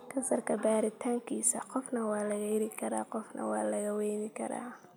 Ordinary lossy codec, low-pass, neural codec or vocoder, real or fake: none; none; none; real